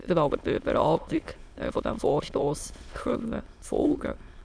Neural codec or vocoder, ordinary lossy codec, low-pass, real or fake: autoencoder, 22.05 kHz, a latent of 192 numbers a frame, VITS, trained on many speakers; none; none; fake